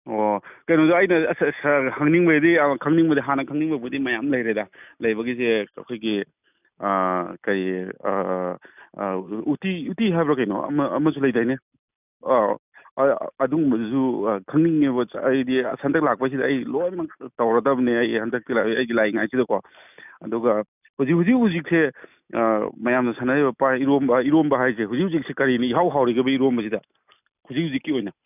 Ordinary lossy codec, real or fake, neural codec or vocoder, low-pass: none; real; none; 3.6 kHz